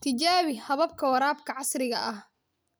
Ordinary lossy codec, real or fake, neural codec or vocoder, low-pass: none; real; none; none